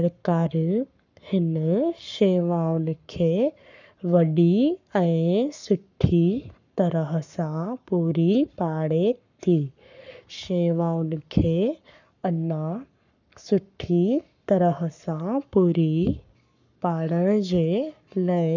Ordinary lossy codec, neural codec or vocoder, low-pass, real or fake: none; codec, 44.1 kHz, 7.8 kbps, Pupu-Codec; 7.2 kHz; fake